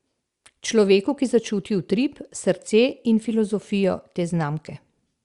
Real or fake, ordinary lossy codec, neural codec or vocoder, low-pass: real; Opus, 64 kbps; none; 10.8 kHz